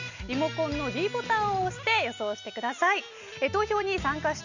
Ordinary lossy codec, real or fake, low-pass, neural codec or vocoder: none; real; 7.2 kHz; none